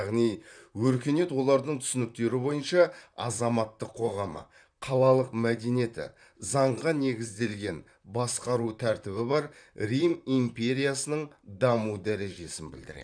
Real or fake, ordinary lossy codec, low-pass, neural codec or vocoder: fake; none; 9.9 kHz; vocoder, 44.1 kHz, 128 mel bands, Pupu-Vocoder